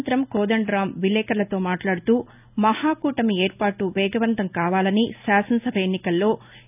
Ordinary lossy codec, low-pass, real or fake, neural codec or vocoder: none; 3.6 kHz; real; none